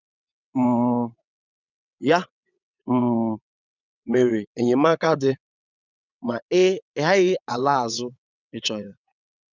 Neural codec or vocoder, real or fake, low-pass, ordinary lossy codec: vocoder, 22.05 kHz, 80 mel bands, WaveNeXt; fake; 7.2 kHz; none